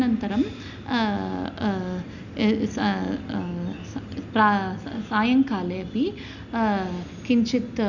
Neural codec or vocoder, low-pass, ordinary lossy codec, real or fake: none; 7.2 kHz; none; real